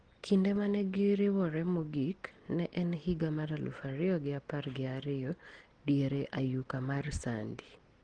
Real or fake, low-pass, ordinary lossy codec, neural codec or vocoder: real; 9.9 kHz; Opus, 16 kbps; none